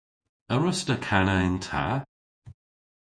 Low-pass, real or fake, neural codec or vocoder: 9.9 kHz; fake; vocoder, 48 kHz, 128 mel bands, Vocos